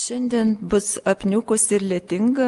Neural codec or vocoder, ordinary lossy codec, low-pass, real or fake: none; Opus, 32 kbps; 10.8 kHz; real